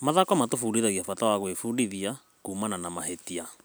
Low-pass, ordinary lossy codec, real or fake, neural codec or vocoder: none; none; real; none